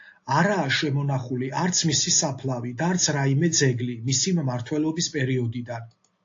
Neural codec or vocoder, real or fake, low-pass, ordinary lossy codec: none; real; 7.2 kHz; AAC, 48 kbps